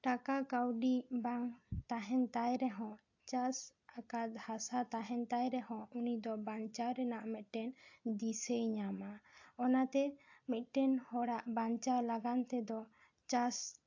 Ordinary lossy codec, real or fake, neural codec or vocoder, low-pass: AAC, 48 kbps; real; none; 7.2 kHz